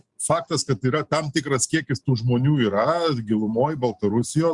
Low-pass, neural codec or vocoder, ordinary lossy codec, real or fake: 10.8 kHz; none; Opus, 64 kbps; real